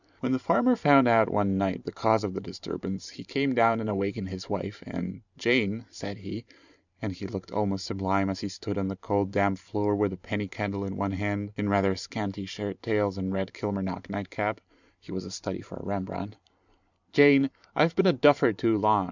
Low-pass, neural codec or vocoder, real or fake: 7.2 kHz; vocoder, 44.1 kHz, 128 mel bands every 512 samples, BigVGAN v2; fake